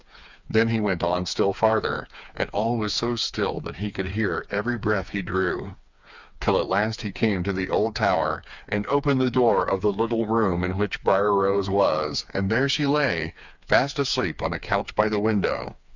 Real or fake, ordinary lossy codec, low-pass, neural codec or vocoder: fake; Opus, 64 kbps; 7.2 kHz; codec, 16 kHz, 4 kbps, FreqCodec, smaller model